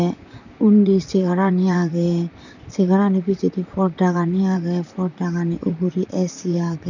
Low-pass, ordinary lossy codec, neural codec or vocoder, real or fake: 7.2 kHz; none; vocoder, 44.1 kHz, 128 mel bands, Pupu-Vocoder; fake